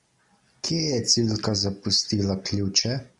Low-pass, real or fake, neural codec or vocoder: 10.8 kHz; real; none